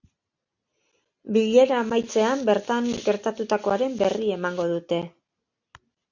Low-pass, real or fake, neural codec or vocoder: 7.2 kHz; real; none